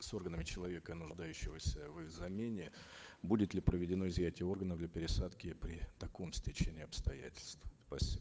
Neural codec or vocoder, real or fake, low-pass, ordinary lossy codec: codec, 16 kHz, 8 kbps, FunCodec, trained on Chinese and English, 25 frames a second; fake; none; none